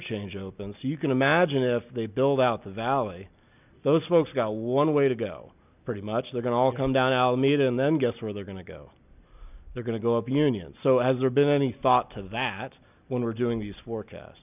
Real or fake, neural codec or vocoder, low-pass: real; none; 3.6 kHz